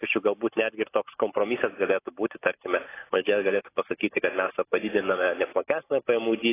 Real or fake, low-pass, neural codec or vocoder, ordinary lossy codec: real; 3.6 kHz; none; AAC, 16 kbps